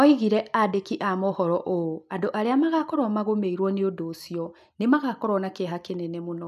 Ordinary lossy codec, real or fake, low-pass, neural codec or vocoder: none; real; 14.4 kHz; none